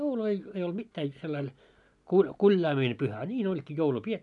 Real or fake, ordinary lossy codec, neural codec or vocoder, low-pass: real; none; none; 10.8 kHz